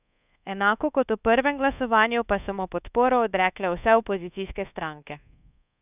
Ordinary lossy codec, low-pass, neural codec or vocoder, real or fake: none; 3.6 kHz; codec, 24 kHz, 1.2 kbps, DualCodec; fake